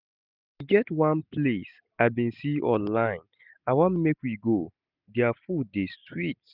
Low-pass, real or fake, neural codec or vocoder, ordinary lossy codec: 5.4 kHz; real; none; none